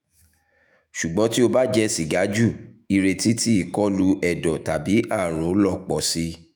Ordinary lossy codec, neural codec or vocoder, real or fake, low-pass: none; autoencoder, 48 kHz, 128 numbers a frame, DAC-VAE, trained on Japanese speech; fake; none